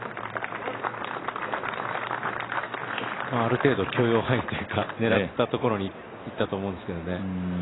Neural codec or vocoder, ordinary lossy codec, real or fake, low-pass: none; AAC, 16 kbps; real; 7.2 kHz